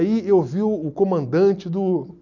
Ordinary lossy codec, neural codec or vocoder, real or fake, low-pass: none; none; real; 7.2 kHz